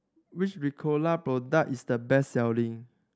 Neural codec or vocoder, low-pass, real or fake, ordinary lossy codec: none; none; real; none